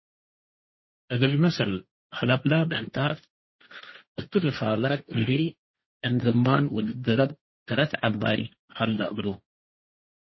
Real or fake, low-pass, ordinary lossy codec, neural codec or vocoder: fake; 7.2 kHz; MP3, 24 kbps; codec, 16 kHz, 1.1 kbps, Voila-Tokenizer